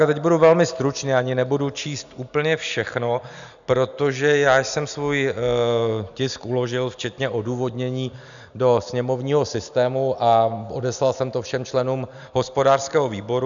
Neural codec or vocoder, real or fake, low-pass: none; real; 7.2 kHz